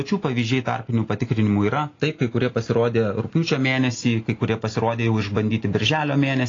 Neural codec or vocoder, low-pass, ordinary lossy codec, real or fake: none; 7.2 kHz; AAC, 32 kbps; real